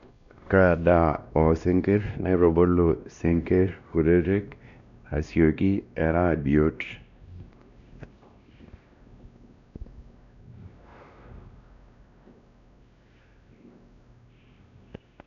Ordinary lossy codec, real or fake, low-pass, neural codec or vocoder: MP3, 96 kbps; fake; 7.2 kHz; codec, 16 kHz, 1 kbps, X-Codec, WavLM features, trained on Multilingual LibriSpeech